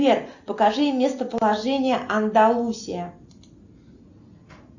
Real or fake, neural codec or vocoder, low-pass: real; none; 7.2 kHz